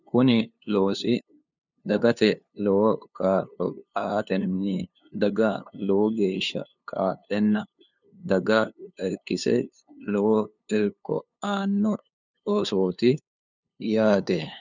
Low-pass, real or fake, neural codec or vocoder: 7.2 kHz; fake; codec, 16 kHz, 2 kbps, FunCodec, trained on LibriTTS, 25 frames a second